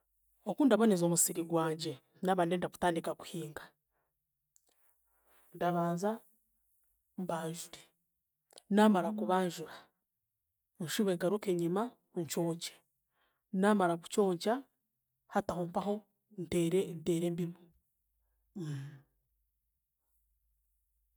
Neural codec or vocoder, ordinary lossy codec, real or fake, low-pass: none; none; real; none